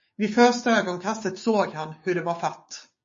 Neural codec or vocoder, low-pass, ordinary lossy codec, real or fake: codec, 16 kHz, 6 kbps, DAC; 7.2 kHz; MP3, 32 kbps; fake